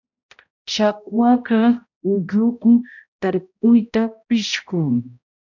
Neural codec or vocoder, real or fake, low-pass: codec, 16 kHz, 0.5 kbps, X-Codec, HuBERT features, trained on balanced general audio; fake; 7.2 kHz